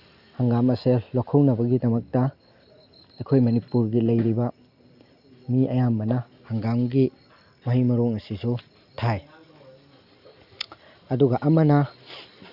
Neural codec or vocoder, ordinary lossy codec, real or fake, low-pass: none; Opus, 64 kbps; real; 5.4 kHz